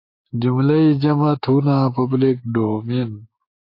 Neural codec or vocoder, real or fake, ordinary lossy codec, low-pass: codec, 16 kHz, 6 kbps, DAC; fake; AAC, 32 kbps; 5.4 kHz